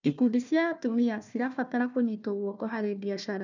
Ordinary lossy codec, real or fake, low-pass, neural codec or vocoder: none; fake; 7.2 kHz; codec, 16 kHz, 1 kbps, FunCodec, trained on Chinese and English, 50 frames a second